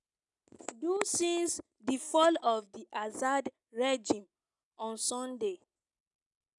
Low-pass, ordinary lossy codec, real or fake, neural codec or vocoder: 10.8 kHz; none; real; none